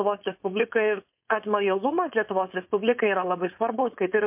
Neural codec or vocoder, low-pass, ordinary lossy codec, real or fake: codec, 16 kHz, 4.8 kbps, FACodec; 3.6 kHz; MP3, 32 kbps; fake